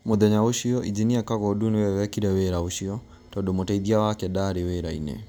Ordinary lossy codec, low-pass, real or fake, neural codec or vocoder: none; none; real; none